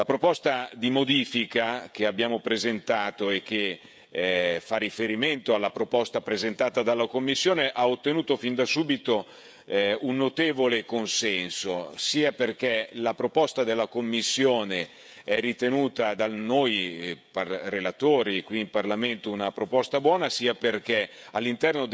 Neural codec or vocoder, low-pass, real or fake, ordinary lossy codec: codec, 16 kHz, 16 kbps, FreqCodec, smaller model; none; fake; none